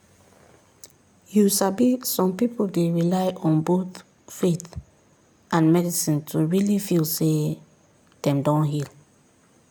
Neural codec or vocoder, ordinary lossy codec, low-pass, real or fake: none; none; none; real